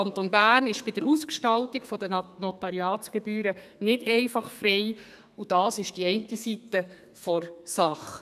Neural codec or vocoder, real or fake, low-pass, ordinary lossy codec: codec, 32 kHz, 1.9 kbps, SNAC; fake; 14.4 kHz; none